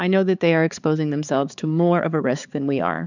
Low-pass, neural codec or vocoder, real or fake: 7.2 kHz; codec, 16 kHz, 4 kbps, X-Codec, HuBERT features, trained on balanced general audio; fake